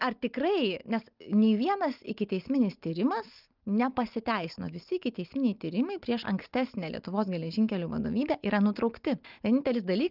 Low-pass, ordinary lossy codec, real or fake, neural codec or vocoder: 5.4 kHz; Opus, 32 kbps; real; none